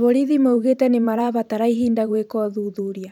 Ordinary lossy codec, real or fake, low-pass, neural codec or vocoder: none; fake; 19.8 kHz; vocoder, 44.1 kHz, 128 mel bands every 512 samples, BigVGAN v2